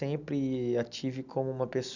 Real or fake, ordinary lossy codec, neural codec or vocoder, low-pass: real; none; none; 7.2 kHz